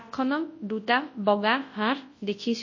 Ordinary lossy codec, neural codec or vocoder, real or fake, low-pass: MP3, 32 kbps; codec, 24 kHz, 0.9 kbps, WavTokenizer, large speech release; fake; 7.2 kHz